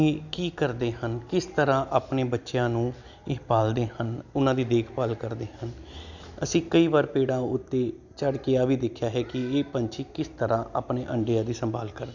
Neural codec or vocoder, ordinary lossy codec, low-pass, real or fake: none; none; 7.2 kHz; real